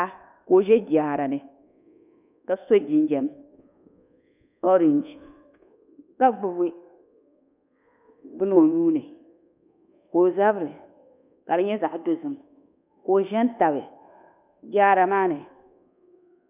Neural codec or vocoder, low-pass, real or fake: codec, 24 kHz, 1.2 kbps, DualCodec; 3.6 kHz; fake